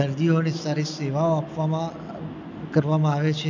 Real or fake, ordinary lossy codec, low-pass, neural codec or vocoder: real; MP3, 64 kbps; 7.2 kHz; none